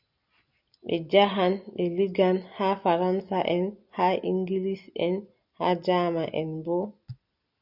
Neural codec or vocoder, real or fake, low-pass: none; real; 5.4 kHz